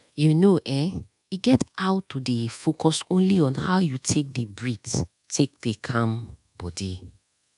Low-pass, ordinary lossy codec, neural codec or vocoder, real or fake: 10.8 kHz; none; codec, 24 kHz, 1.2 kbps, DualCodec; fake